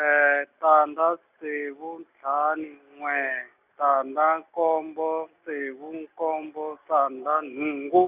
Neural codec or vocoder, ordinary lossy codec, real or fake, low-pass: none; none; real; 3.6 kHz